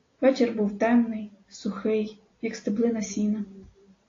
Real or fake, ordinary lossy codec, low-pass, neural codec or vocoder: real; AAC, 32 kbps; 7.2 kHz; none